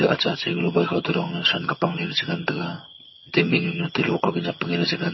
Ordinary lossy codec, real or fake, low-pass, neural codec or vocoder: MP3, 24 kbps; fake; 7.2 kHz; vocoder, 22.05 kHz, 80 mel bands, HiFi-GAN